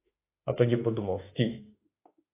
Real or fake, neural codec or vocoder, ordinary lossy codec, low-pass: fake; autoencoder, 48 kHz, 32 numbers a frame, DAC-VAE, trained on Japanese speech; AAC, 24 kbps; 3.6 kHz